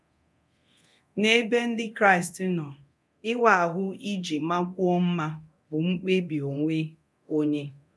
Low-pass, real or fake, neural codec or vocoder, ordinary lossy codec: none; fake; codec, 24 kHz, 0.9 kbps, DualCodec; none